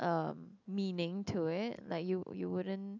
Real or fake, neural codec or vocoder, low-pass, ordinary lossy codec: real; none; 7.2 kHz; none